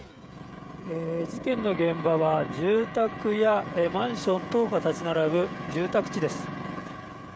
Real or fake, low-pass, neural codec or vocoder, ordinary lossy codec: fake; none; codec, 16 kHz, 16 kbps, FreqCodec, smaller model; none